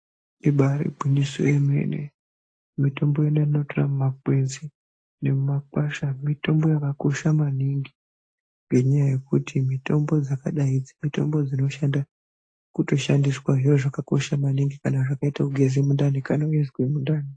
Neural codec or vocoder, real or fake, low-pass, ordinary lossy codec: none; real; 9.9 kHz; AAC, 48 kbps